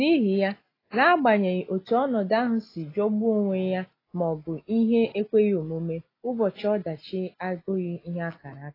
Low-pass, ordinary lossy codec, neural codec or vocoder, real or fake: 5.4 kHz; AAC, 24 kbps; none; real